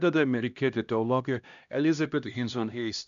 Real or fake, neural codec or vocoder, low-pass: fake; codec, 16 kHz, 1 kbps, X-Codec, HuBERT features, trained on LibriSpeech; 7.2 kHz